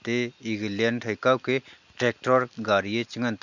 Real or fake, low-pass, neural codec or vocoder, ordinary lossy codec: real; 7.2 kHz; none; none